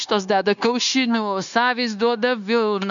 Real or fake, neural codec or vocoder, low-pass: fake; codec, 16 kHz, 0.9 kbps, LongCat-Audio-Codec; 7.2 kHz